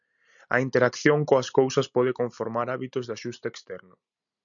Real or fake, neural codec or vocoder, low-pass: real; none; 7.2 kHz